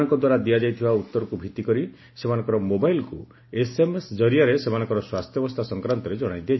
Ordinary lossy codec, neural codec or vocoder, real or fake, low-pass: MP3, 24 kbps; none; real; 7.2 kHz